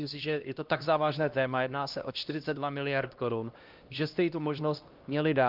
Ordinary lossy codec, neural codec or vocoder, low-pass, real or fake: Opus, 32 kbps; codec, 16 kHz, 1 kbps, X-Codec, HuBERT features, trained on LibriSpeech; 5.4 kHz; fake